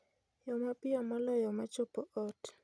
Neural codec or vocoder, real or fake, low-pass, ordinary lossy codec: none; real; none; none